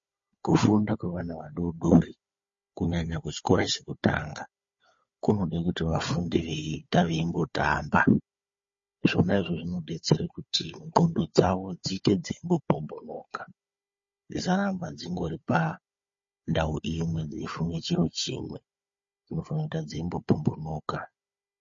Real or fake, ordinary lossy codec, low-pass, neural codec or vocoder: fake; MP3, 32 kbps; 7.2 kHz; codec, 16 kHz, 4 kbps, FunCodec, trained on Chinese and English, 50 frames a second